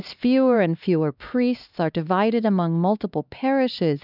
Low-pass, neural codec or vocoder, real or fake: 5.4 kHz; codec, 16 kHz, 2 kbps, X-Codec, HuBERT features, trained on LibriSpeech; fake